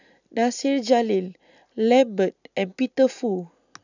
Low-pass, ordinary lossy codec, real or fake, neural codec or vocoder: 7.2 kHz; none; real; none